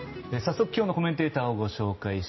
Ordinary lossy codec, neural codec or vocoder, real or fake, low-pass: MP3, 24 kbps; none; real; 7.2 kHz